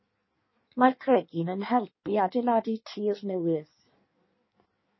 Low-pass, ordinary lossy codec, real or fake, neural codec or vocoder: 7.2 kHz; MP3, 24 kbps; fake; codec, 16 kHz in and 24 kHz out, 1.1 kbps, FireRedTTS-2 codec